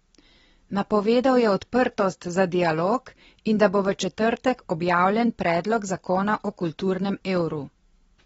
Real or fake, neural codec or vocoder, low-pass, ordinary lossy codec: real; none; 10.8 kHz; AAC, 24 kbps